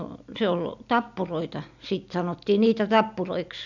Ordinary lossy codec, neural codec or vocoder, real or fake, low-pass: none; none; real; 7.2 kHz